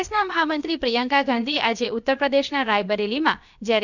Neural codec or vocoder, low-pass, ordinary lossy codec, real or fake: codec, 16 kHz, about 1 kbps, DyCAST, with the encoder's durations; 7.2 kHz; none; fake